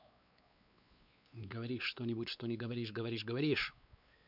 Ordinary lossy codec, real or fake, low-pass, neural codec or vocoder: none; fake; 5.4 kHz; codec, 16 kHz, 4 kbps, X-Codec, WavLM features, trained on Multilingual LibriSpeech